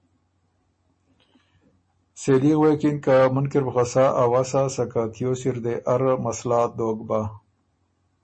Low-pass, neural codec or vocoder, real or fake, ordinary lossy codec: 9.9 kHz; none; real; MP3, 32 kbps